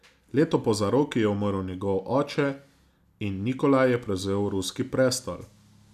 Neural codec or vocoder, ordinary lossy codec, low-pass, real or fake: none; none; 14.4 kHz; real